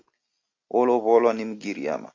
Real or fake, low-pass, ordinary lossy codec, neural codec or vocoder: real; 7.2 kHz; AAC, 48 kbps; none